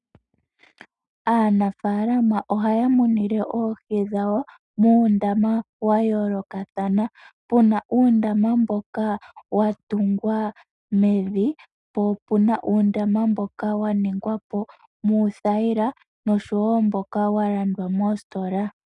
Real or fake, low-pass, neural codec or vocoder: real; 10.8 kHz; none